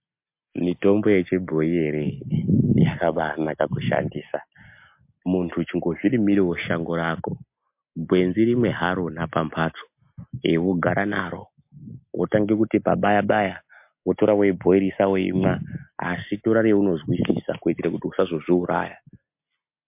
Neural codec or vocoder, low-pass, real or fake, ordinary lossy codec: none; 3.6 kHz; real; MP3, 32 kbps